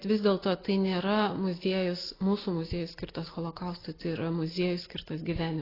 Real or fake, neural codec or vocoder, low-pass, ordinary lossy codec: real; none; 5.4 kHz; AAC, 24 kbps